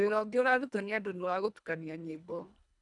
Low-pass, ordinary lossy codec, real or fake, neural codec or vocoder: 10.8 kHz; none; fake; codec, 24 kHz, 1.5 kbps, HILCodec